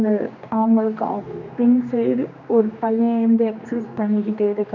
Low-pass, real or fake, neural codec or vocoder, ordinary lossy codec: 7.2 kHz; fake; codec, 16 kHz, 2 kbps, X-Codec, HuBERT features, trained on general audio; none